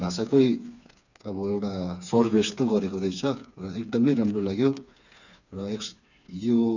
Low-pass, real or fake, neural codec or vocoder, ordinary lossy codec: 7.2 kHz; fake; codec, 16 kHz, 4 kbps, FreqCodec, smaller model; none